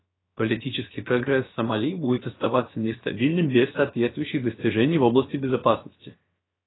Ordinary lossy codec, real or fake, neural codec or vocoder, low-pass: AAC, 16 kbps; fake; codec, 16 kHz, about 1 kbps, DyCAST, with the encoder's durations; 7.2 kHz